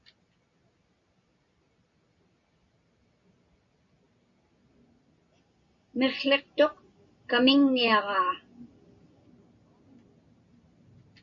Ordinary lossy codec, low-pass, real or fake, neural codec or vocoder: MP3, 64 kbps; 7.2 kHz; real; none